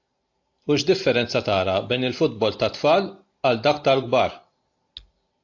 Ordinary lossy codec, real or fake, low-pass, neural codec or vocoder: AAC, 48 kbps; real; 7.2 kHz; none